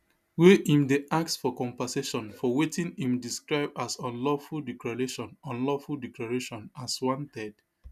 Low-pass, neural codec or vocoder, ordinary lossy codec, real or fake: 14.4 kHz; none; none; real